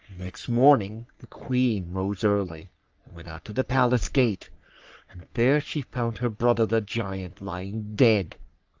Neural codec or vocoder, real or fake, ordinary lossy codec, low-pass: codec, 44.1 kHz, 3.4 kbps, Pupu-Codec; fake; Opus, 24 kbps; 7.2 kHz